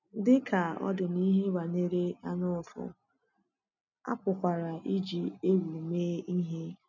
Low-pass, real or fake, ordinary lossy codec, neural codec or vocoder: 7.2 kHz; real; none; none